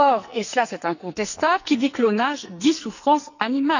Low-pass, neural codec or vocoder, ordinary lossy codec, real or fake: 7.2 kHz; codec, 44.1 kHz, 2.6 kbps, SNAC; none; fake